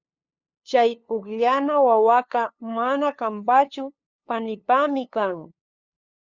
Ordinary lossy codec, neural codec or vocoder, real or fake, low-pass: Opus, 64 kbps; codec, 16 kHz, 2 kbps, FunCodec, trained on LibriTTS, 25 frames a second; fake; 7.2 kHz